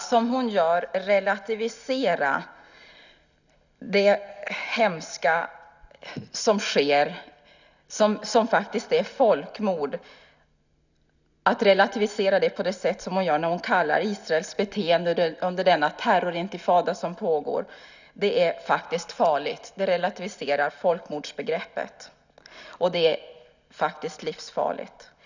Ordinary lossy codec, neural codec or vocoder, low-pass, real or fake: none; none; 7.2 kHz; real